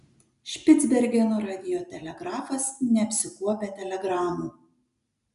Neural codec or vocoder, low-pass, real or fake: none; 10.8 kHz; real